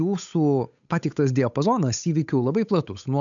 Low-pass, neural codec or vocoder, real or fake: 7.2 kHz; codec, 16 kHz, 8 kbps, FunCodec, trained on Chinese and English, 25 frames a second; fake